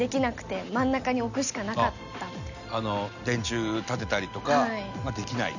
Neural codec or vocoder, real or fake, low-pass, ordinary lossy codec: none; real; 7.2 kHz; none